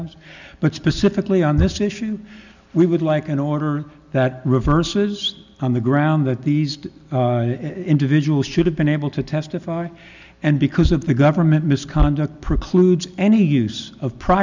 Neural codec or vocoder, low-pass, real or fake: none; 7.2 kHz; real